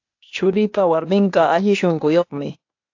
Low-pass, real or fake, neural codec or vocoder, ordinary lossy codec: 7.2 kHz; fake; codec, 16 kHz, 0.8 kbps, ZipCodec; AAC, 48 kbps